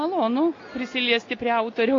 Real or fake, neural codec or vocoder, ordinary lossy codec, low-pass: real; none; AAC, 32 kbps; 7.2 kHz